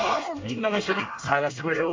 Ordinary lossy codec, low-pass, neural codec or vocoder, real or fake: MP3, 64 kbps; 7.2 kHz; codec, 24 kHz, 1 kbps, SNAC; fake